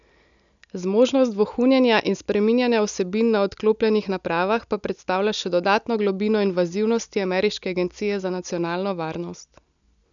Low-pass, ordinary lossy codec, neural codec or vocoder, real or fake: 7.2 kHz; none; none; real